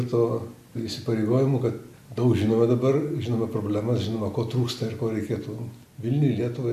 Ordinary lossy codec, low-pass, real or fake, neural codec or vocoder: AAC, 96 kbps; 14.4 kHz; real; none